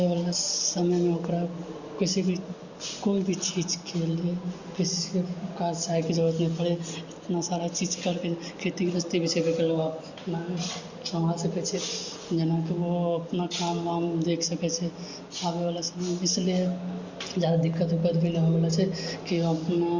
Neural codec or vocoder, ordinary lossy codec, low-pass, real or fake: none; Opus, 64 kbps; 7.2 kHz; real